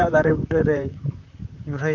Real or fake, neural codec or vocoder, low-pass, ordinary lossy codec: fake; vocoder, 44.1 kHz, 128 mel bands, Pupu-Vocoder; 7.2 kHz; none